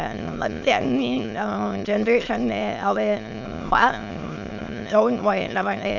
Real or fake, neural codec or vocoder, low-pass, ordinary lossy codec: fake; autoencoder, 22.05 kHz, a latent of 192 numbers a frame, VITS, trained on many speakers; 7.2 kHz; none